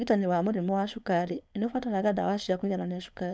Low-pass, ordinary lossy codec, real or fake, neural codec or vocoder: none; none; fake; codec, 16 kHz, 4.8 kbps, FACodec